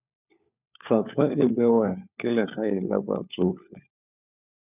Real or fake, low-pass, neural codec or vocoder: fake; 3.6 kHz; codec, 16 kHz, 4 kbps, FunCodec, trained on LibriTTS, 50 frames a second